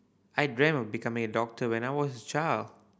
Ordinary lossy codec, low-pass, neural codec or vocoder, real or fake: none; none; none; real